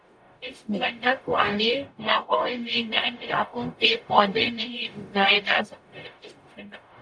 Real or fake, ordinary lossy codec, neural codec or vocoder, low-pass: fake; AAC, 64 kbps; codec, 44.1 kHz, 0.9 kbps, DAC; 9.9 kHz